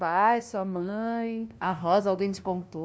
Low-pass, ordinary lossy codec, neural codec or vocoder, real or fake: none; none; codec, 16 kHz, 0.5 kbps, FunCodec, trained on LibriTTS, 25 frames a second; fake